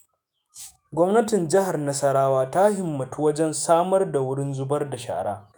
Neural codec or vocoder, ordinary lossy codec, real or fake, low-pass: autoencoder, 48 kHz, 128 numbers a frame, DAC-VAE, trained on Japanese speech; none; fake; none